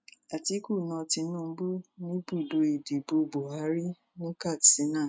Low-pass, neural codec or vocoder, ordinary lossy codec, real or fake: none; none; none; real